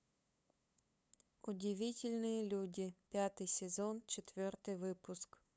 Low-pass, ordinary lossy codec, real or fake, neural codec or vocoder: none; none; fake; codec, 16 kHz, 8 kbps, FunCodec, trained on LibriTTS, 25 frames a second